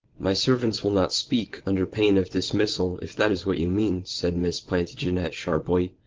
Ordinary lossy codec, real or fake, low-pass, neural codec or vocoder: Opus, 16 kbps; fake; 7.2 kHz; vocoder, 22.05 kHz, 80 mel bands, WaveNeXt